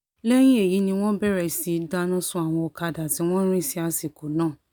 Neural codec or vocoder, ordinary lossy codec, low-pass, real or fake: none; none; none; real